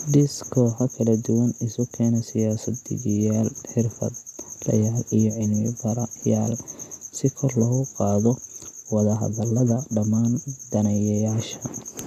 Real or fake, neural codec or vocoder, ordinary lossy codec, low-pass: real; none; AAC, 96 kbps; 14.4 kHz